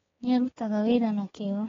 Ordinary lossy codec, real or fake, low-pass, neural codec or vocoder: AAC, 24 kbps; fake; 7.2 kHz; codec, 16 kHz, 4 kbps, X-Codec, HuBERT features, trained on balanced general audio